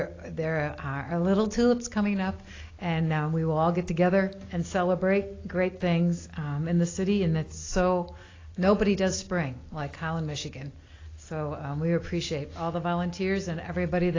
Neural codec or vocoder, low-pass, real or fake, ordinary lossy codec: none; 7.2 kHz; real; AAC, 32 kbps